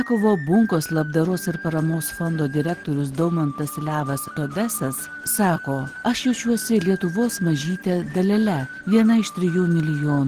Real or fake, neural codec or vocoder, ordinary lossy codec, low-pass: real; none; Opus, 16 kbps; 14.4 kHz